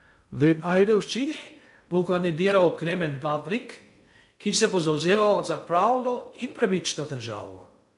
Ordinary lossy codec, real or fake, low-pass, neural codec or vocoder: MP3, 64 kbps; fake; 10.8 kHz; codec, 16 kHz in and 24 kHz out, 0.6 kbps, FocalCodec, streaming, 2048 codes